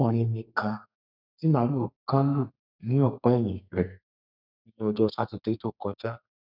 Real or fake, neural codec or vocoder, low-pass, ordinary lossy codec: fake; codec, 32 kHz, 1.9 kbps, SNAC; 5.4 kHz; none